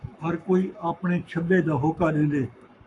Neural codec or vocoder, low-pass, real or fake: codec, 44.1 kHz, 7.8 kbps, Pupu-Codec; 10.8 kHz; fake